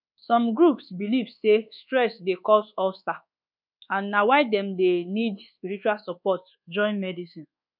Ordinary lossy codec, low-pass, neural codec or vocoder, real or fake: none; 5.4 kHz; codec, 24 kHz, 1.2 kbps, DualCodec; fake